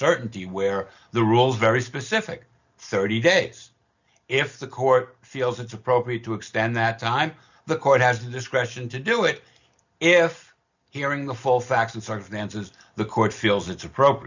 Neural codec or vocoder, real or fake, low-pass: none; real; 7.2 kHz